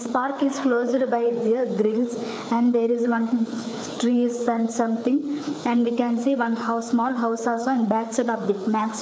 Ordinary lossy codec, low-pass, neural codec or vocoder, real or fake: none; none; codec, 16 kHz, 4 kbps, FreqCodec, larger model; fake